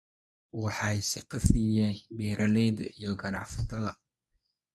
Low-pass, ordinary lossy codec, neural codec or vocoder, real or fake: none; none; codec, 24 kHz, 0.9 kbps, WavTokenizer, medium speech release version 1; fake